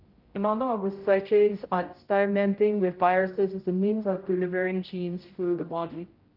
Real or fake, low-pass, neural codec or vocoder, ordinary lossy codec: fake; 5.4 kHz; codec, 16 kHz, 0.5 kbps, X-Codec, HuBERT features, trained on general audio; Opus, 32 kbps